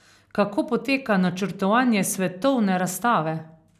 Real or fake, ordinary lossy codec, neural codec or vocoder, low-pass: real; none; none; 14.4 kHz